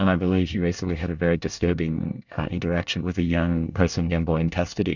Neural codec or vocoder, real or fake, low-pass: codec, 24 kHz, 1 kbps, SNAC; fake; 7.2 kHz